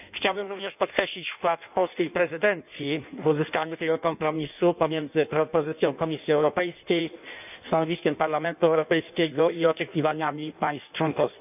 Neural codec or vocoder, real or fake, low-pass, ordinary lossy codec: codec, 16 kHz in and 24 kHz out, 1.1 kbps, FireRedTTS-2 codec; fake; 3.6 kHz; none